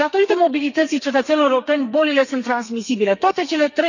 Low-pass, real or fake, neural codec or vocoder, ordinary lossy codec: 7.2 kHz; fake; codec, 32 kHz, 1.9 kbps, SNAC; none